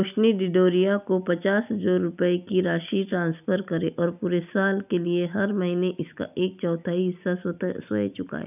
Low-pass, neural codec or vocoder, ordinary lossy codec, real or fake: 3.6 kHz; none; none; real